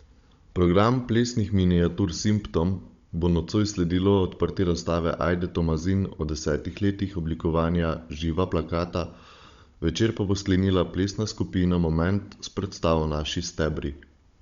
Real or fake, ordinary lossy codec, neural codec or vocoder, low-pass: fake; Opus, 64 kbps; codec, 16 kHz, 16 kbps, FunCodec, trained on Chinese and English, 50 frames a second; 7.2 kHz